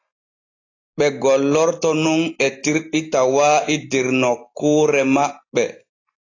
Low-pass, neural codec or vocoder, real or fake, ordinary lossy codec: 7.2 kHz; none; real; AAC, 32 kbps